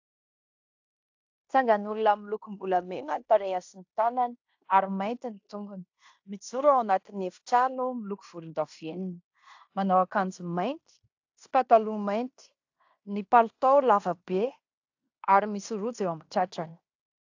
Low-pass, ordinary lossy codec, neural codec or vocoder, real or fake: 7.2 kHz; AAC, 48 kbps; codec, 16 kHz in and 24 kHz out, 0.9 kbps, LongCat-Audio-Codec, fine tuned four codebook decoder; fake